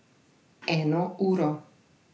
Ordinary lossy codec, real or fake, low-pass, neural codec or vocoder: none; real; none; none